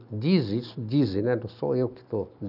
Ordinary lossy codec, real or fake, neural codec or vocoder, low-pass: none; real; none; 5.4 kHz